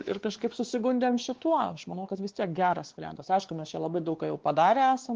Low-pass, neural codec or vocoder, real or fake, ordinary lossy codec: 7.2 kHz; codec, 16 kHz, 2 kbps, X-Codec, WavLM features, trained on Multilingual LibriSpeech; fake; Opus, 16 kbps